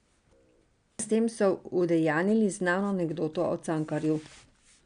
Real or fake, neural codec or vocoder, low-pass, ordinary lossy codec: real; none; 9.9 kHz; none